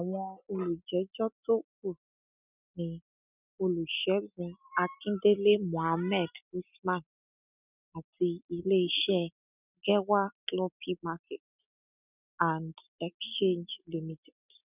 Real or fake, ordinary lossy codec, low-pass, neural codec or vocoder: real; none; 3.6 kHz; none